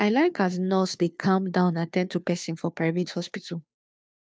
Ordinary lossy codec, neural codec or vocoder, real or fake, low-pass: none; codec, 16 kHz, 2 kbps, FunCodec, trained on Chinese and English, 25 frames a second; fake; none